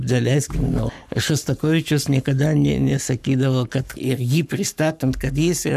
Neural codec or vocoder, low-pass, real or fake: codec, 44.1 kHz, 7.8 kbps, Pupu-Codec; 14.4 kHz; fake